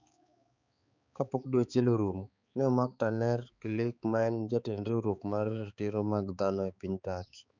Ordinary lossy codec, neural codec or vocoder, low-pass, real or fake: none; codec, 16 kHz, 4 kbps, X-Codec, HuBERT features, trained on balanced general audio; 7.2 kHz; fake